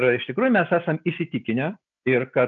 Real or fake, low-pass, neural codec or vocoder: real; 7.2 kHz; none